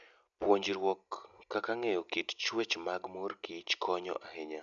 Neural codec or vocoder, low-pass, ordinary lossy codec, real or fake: none; 7.2 kHz; none; real